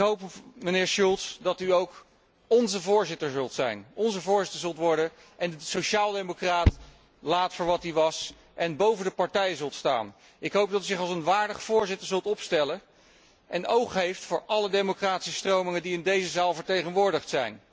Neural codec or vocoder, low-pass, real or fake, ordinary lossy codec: none; none; real; none